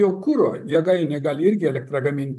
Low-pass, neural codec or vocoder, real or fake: 14.4 kHz; vocoder, 44.1 kHz, 128 mel bands, Pupu-Vocoder; fake